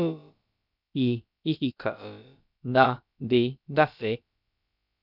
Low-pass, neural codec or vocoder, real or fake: 5.4 kHz; codec, 16 kHz, about 1 kbps, DyCAST, with the encoder's durations; fake